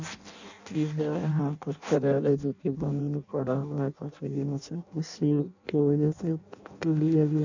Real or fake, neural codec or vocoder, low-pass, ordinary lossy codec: fake; codec, 16 kHz in and 24 kHz out, 0.6 kbps, FireRedTTS-2 codec; 7.2 kHz; none